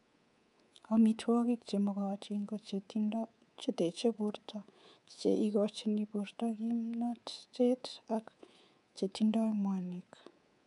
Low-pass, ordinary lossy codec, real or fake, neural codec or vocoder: 10.8 kHz; none; fake; codec, 24 kHz, 3.1 kbps, DualCodec